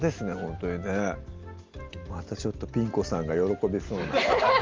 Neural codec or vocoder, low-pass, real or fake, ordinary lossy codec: none; 7.2 kHz; real; Opus, 24 kbps